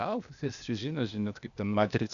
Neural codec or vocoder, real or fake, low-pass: codec, 16 kHz, 0.8 kbps, ZipCodec; fake; 7.2 kHz